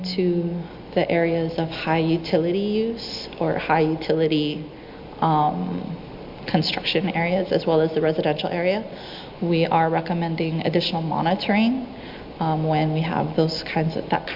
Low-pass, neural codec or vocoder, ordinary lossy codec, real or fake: 5.4 kHz; none; MP3, 48 kbps; real